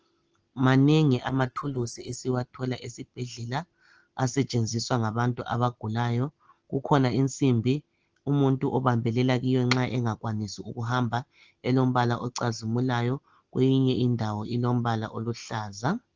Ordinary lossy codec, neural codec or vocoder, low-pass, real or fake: Opus, 16 kbps; none; 7.2 kHz; real